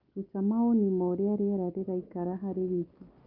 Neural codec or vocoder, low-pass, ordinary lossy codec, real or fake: none; 5.4 kHz; Opus, 24 kbps; real